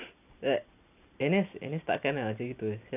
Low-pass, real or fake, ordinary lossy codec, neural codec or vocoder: 3.6 kHz; real; none; none